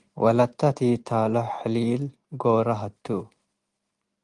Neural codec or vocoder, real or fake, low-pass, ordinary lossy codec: none; real; 10.8 kHz; Opus, 24 kbps